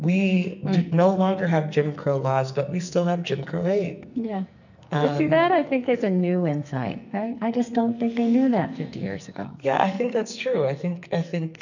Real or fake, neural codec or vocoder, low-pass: fake; codec, 44.1 kHz, 2.6 kbps, SNAC; 7.2 kHz